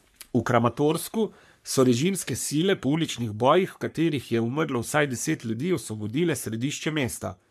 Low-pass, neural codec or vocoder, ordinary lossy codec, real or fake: 14.4 kHz; codec, 44.1 kHz, 3.4 kbps, Pupu-Codec; MP3, 96 kbps; fake